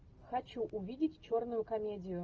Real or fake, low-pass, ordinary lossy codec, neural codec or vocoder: real; 7.2 kHz; Opus, 24 kbps; none